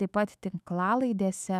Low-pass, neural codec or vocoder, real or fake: 14.4 kHz; autoencoder, 48 kHz, 128 numbers a frame, DAC-VAE, trained on Japanese speech; fake